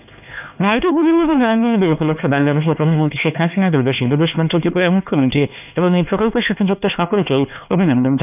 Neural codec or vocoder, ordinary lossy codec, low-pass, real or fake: codec, 16 kHz, 2 kbps, X-Codec, HuBERT features, trained on LibriSpeech; none; 3.6 kHz; fake